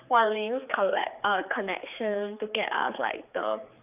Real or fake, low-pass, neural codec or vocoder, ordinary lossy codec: fake; 3.6 kHz; codec, 16 kHz, 4 kbps, X-Codec, HuBERT features, trained on general audio; none